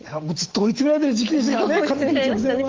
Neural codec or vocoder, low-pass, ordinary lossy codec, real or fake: autoencoder, 48 kHz, 128 numbers a frame, DAC-VAE, trained on Japanese speech; 7.2 kHz; Opus, 16 kbps; fake